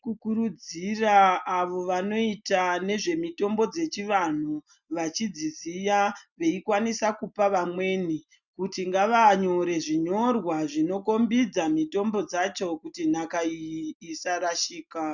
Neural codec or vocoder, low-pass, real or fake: none; 7.2 kHz; real